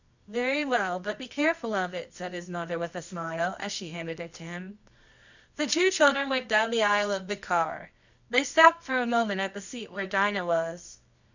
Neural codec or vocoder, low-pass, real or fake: codec, 24 kHz, 0.9 kbps, WavTokenizer, medium music audio release; 7.2 kHz; fake